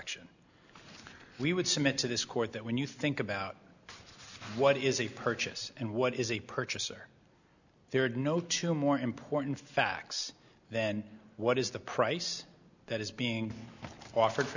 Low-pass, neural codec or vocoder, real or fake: 7.2 kHz; none; real